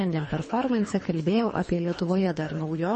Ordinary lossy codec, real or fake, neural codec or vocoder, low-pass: MP3, 32 kbps; fake; codec, 24 kHz, 3 kbps, HILCodec; 10.8 kHz